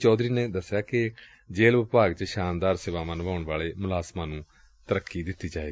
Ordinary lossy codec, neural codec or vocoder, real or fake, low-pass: none; none; real; none